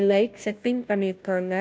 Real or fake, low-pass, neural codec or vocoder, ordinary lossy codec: fake; none; codec, 16 kHz, 0.5 kbps, FunCodec, trained on Chinese and English, 25 frames a second; none